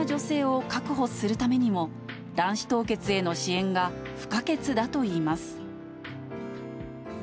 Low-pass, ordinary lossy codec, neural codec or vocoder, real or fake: none; none; none; real